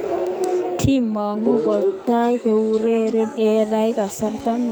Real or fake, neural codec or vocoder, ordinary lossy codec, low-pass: fake; codec, 44.1 kHz, 2.6 kbps, SNAC; none; none